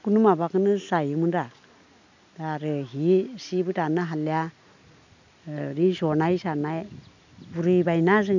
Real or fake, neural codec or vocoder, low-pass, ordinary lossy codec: real; none; 7.2 kHz; none